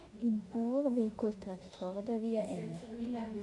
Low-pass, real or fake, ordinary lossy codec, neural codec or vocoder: 10.8 kHz; fake; AAC, 48 kbps; autoencoder, 48 kHz, 32 numbers a frame, DAC-VAE, trained on Japanese speech